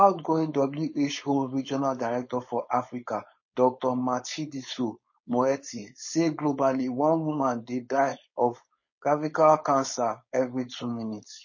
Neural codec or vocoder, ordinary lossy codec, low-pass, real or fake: codec, 16 kHz, 4.8 kbps, FACodec; MP3, 32 kbps; 7.2 kHz; fake